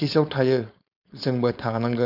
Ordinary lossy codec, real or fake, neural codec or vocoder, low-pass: none; fake; codec, 16 kHz, 4.8 kbps, FACodec; 5.4 kHz